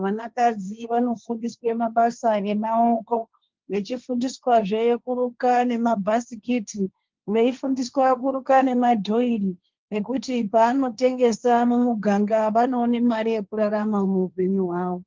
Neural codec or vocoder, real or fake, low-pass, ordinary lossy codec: codec, 16 kHz, 1.1 kbps, Voila-Tokenizer; fake; 7.2 kHz; Opus, 32 kbps